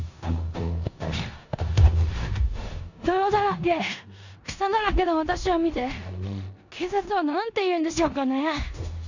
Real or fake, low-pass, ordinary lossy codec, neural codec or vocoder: fake; 7.2 kHz; none; codec, 16 kHz in and 24 kHz out, 0.9 kbps, LongCat-Audio-Codec, four codebook decoder